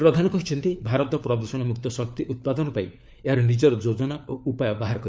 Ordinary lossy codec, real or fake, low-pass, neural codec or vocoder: none; fake; none; codec, 16 kHz, 4 kbps, FunCodec, trained on LibriTTS, 50 frames a second